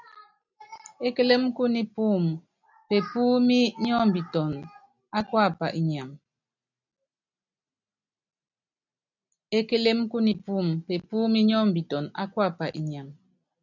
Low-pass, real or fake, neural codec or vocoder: 7.2 kHz; real; none